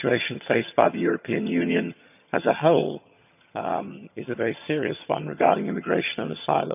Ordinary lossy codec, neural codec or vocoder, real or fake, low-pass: none; vocoder, 22.05 kHz, 80 mel bands, HiFi-GAN; fake; 3.6 kHz